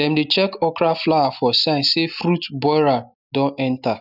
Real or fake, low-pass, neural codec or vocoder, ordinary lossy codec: real; 5.4 kHz; none; none